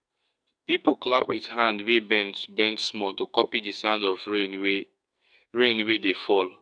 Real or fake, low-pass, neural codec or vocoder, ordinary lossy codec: fake; 9.9 kHz; codec, 44.1 kHz, 2.6 kbps, SNAC; none